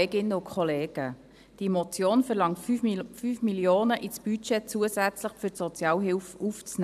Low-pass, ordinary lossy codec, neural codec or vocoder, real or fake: 14.4 kHz; none; vocoder, 44.1 kHz, 128 mel bands every 512 samples, BigVGAN v2; fake